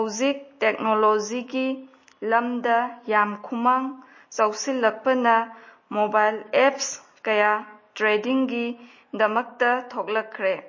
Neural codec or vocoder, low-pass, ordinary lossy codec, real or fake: none; 7.2 kHz; MP3, 32 kbps; real